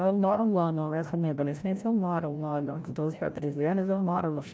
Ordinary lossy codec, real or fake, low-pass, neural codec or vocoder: none; fake; none; codec, 16 kHz, 0.5 kbps, FreqCodec, larger model